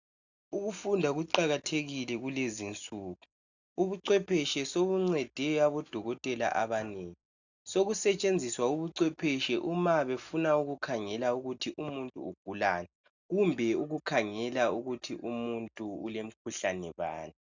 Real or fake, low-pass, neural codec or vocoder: real; 7.2 kHz; none